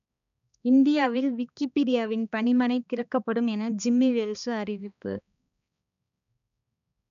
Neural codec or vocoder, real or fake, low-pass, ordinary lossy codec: codec, 16 kHz, 2 kbps, X-Codec, HuBERT features, trained on balanced general audio; fake; 7.2 kHz; none